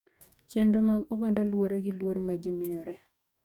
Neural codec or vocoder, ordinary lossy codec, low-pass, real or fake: codec, 44.1 kHz, 2.6 kbps, DAC; none; 19.8 kHz; fake